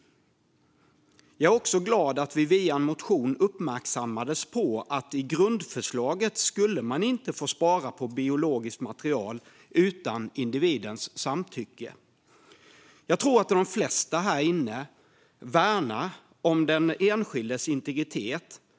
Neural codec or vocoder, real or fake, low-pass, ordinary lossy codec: none; real; none; none